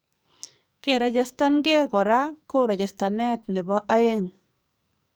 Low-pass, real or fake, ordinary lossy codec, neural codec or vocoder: none; fake; none; codec, 44.1 kHz, 2.6 kbps, SNAC